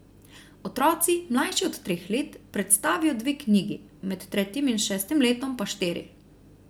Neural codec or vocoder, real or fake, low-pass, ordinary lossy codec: none; real; none; none